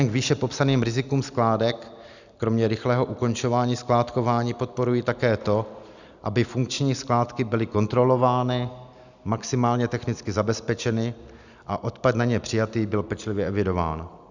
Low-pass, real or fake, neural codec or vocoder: 7.2 kHz; real; none